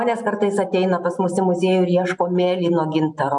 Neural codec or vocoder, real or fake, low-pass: none; real; 10.8 kHz